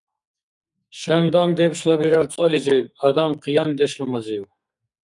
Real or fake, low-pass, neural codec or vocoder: fake; 10.8 kHz; codec, 44.1 kHz, 2.6 kbps, SNAC